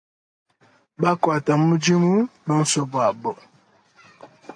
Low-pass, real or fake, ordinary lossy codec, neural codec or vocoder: 9.9 kHz; real; AAC, 64 kbps; none